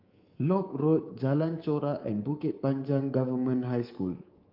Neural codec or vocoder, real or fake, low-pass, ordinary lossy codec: codec, 24 kHz, 3.1 kbps, DualCodec; fake; 5.4 kHz; Opus, 32 kbps